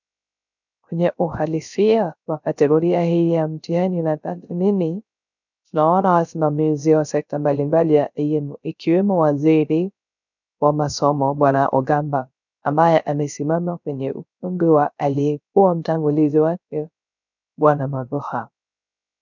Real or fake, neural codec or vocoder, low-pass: fake; codec, 16 kHz, 0.3 kbps, FocalCodec; 7.2 kHz